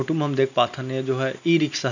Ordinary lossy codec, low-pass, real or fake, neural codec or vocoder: none; 7.2 kHz; real; none